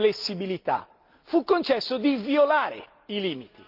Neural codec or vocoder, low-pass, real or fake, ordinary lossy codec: none; 5.4 kHz; real; Opus, 32 kbps